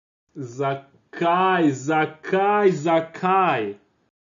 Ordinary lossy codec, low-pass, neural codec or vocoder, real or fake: none; 7.2 kHz; none; real